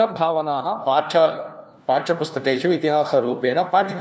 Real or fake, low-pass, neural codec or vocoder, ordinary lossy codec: fake; none; codec, 16 kHz, 1 kbps, FunCodec, trained on LibriTTS, 50 frames a second; none